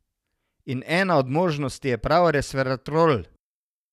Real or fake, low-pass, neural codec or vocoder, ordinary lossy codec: real; 14.4 kHz; none; none